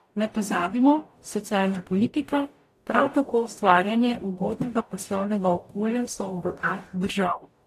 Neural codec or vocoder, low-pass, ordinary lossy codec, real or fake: codec, 44.1 kHz, 0.9 kbps, DAC; 14.4 kHz; AAC, 64 kbps; fake